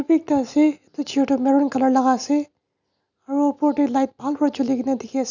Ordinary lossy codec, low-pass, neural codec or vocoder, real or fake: none; 7.2 kHz; none; real